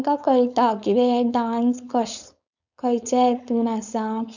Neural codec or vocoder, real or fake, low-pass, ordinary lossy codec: codec, 16 kHz, 4.8 kbps, FACodec; fake; 7.2 kHz; none